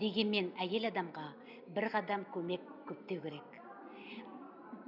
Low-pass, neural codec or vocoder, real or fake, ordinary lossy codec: 5.4 kHz; none; real; none